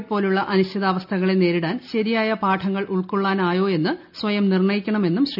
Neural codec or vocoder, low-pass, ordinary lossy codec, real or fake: none; 5.4 kHz; none; real